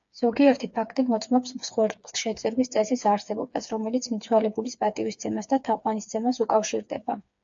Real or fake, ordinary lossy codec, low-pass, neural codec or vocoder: fake; AAC, 64 kbps; 7.2 kHz; codec, 16 kHz, 4 kbps, FreqCodec, smaller model